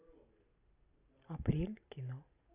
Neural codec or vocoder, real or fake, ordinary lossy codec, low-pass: none; real; AAC, 24 kbps; 3.6 kHz